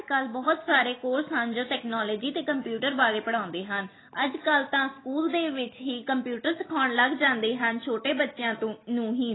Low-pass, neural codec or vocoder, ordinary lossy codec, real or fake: 7.2 kHz; none; AAC, 16 kbps; real